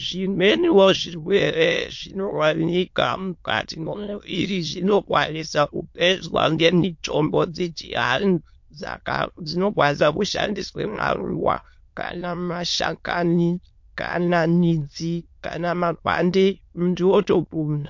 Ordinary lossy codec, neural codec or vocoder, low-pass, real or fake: MP3, 48 kbps; autoencoder, 22.05 kHz, a latent of 192 numbers a frame, VITS, trained on many speakers; 7.2 kHz; fake